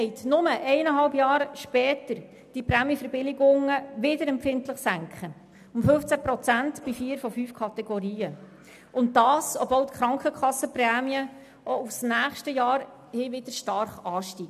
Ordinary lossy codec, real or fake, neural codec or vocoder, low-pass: none; real; none; 14.4 kHz